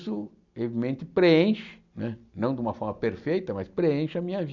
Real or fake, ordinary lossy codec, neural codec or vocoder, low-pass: real; none; none; 7.2 kHz